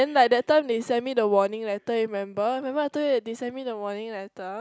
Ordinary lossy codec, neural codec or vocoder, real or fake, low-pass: none; none; real; none